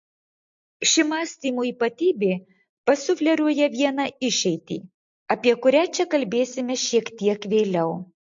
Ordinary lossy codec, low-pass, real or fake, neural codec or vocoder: MP3, 48 kbps; 7.2 kHz; real; none